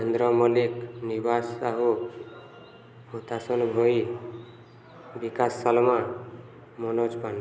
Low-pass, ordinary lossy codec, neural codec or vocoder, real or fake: none; none; none; real